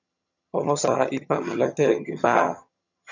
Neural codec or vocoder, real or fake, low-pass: vocoder, 22.05 kHz, 80 mel bands, HiFi-GAN; fake; 7.2 kHz